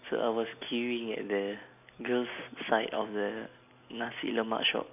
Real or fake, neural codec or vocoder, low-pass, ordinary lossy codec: real; none; 3.6 kHz; none